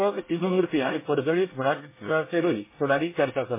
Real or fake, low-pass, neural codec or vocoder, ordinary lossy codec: fake; 3.6 kHz; codec, 24 kHz, 1 kbps, SNAC; MP3, 16 kbps